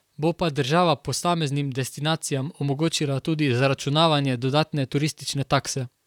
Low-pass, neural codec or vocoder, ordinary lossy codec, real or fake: 19.8 kHz; vocoder, 44.1 kHz, 128 mel bands, Pupu-Vocoder; none; fake